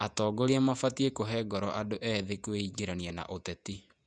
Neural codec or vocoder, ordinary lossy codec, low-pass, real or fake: vocoder, 48 kHz, 128 mel bands, Vocos; none; 9.9 kHz; fake